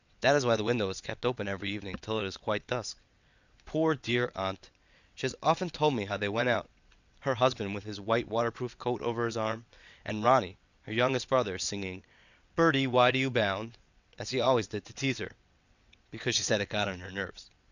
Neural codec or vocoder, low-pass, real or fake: vocoder, 22.05 kHz, 80 mel bands, WaveNeXt; 7.2 kHz; fake